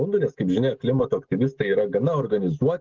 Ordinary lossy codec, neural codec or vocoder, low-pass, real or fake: Opus, 16 kbps; none; 7.2 kHz; real